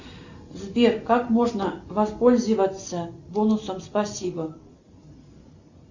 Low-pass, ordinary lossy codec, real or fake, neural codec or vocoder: 7.2 kHz; Opus, 64 kbps; real; none